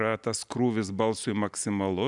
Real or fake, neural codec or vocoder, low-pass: real; none; 10.8 kHz